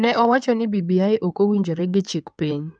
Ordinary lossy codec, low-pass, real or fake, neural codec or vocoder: none; 9.9 kHz; fake; codec, 16 kHz in and 24 kHz out, 2.2 kbps, FireRedTTS-2 codec